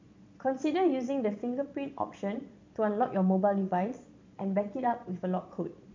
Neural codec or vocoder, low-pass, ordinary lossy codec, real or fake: vocoder, 22.05 kHz, 80 mel bands, Vocos; 7.2 kHz; MP3, 64 kbps; fake